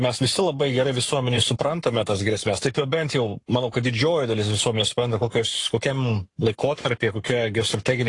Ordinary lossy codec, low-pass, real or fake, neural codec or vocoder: AAC, 48 kbps; 10.8 kHz; fake; codec, 44.1 kHz, 7.8 kbps, Pupu-Codec